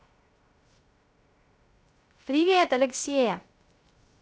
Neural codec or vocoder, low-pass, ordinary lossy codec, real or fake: codec, 16 kHz, 0.3 kbps, FocalCodec; none; none; fake